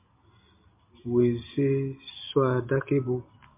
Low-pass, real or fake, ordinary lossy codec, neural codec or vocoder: 3.6 kHz; real; MP3, 24 kbps; none